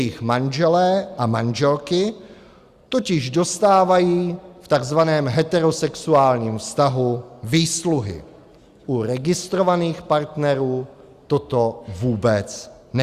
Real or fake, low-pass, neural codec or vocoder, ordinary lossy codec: real; 14.4 kHz; none; Opus, 64 kbps